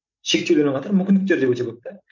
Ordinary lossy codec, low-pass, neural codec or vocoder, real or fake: none; 7.2 kHz; none; real